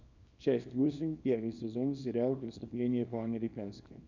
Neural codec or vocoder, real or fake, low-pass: codec, 24 kHz, 0.9 kbps, WavTokenizer, small release; fake; 7.2 kHz